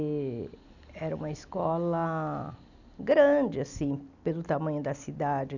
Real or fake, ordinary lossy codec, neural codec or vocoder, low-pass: real; none; none; 7.2 kHz